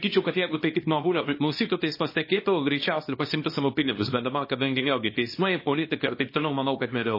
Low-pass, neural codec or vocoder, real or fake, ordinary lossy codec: 5.4 kHz; codec, 24 kHz, 0.9 kbps, WavTokenizer, small release; fake; MP3, 24 kbps